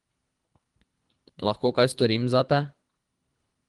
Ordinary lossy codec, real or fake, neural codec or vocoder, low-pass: Opus, 24 kbps; fake; codec, 24 kHz, 3 kbps, HILCodec; 10.8 kHz